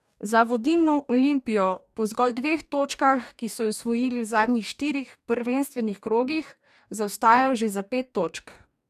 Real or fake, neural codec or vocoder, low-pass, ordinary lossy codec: fake; codec, 44.1 kHz, 2.6 kbps, DAC; 14.4 kHz; none